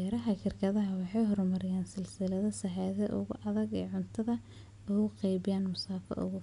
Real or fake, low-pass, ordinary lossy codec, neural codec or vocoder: real; 10.8 kHz; none; none